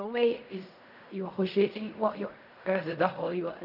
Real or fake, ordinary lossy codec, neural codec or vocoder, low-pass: fake; none; codec, 16 kHz in and 24 kHz out, 0.4 kbps, LongCat-Audio-Codec, fine tuned four codebook decoder; 5.4 kHz